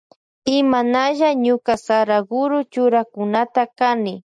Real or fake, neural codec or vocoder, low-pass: real; none; 9.9 kHz